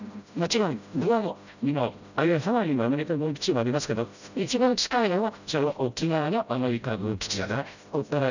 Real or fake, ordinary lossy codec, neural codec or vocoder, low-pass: fake; none; codec, 16 kHz, 0.5 kbps, FreqCodec, smaller model; 7.2 kHz